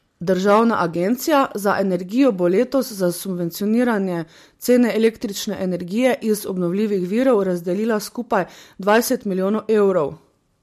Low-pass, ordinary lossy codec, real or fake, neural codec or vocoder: 14.4 kHz; MP3, 64 kbps; real; none